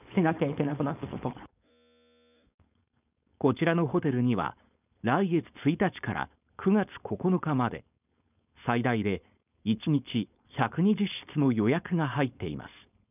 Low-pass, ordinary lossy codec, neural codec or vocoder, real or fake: 3.6 kHz; none; codec, 16 kHz, 4.8 kbps, FACodec; fake